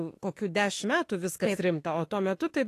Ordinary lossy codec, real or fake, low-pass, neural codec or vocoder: AAC, 48 kbps; fake; 14.4 kHz; autoencoder, 48 kHz, 32 numbers a frame, DAC-VAE, trained on Japanese speech